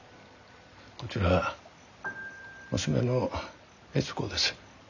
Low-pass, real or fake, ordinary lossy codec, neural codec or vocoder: 7.2 kHz; real; none; none